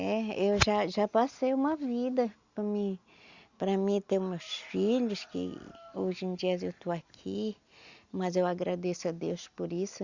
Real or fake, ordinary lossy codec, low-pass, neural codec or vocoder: real; Opus, 64 kbps; 7.2 kHz; none